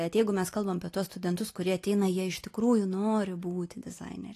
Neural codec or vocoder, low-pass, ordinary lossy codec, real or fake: none; 14.4 kHz; AAC, 48 kbps; real